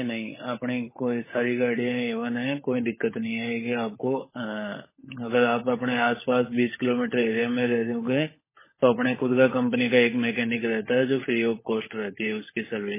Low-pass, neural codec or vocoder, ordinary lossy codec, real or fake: 3.6 kHz; codec, 16 kHz, 16 kbps, FunCodec, trained on LibriTTS, 50 frames a second; MP3, 16 kbps; fake